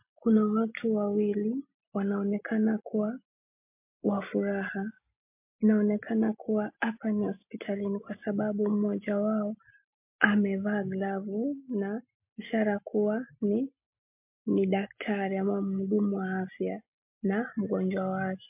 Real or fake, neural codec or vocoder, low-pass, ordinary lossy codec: real; none; 3.6 kHz; MP3, 32 kbps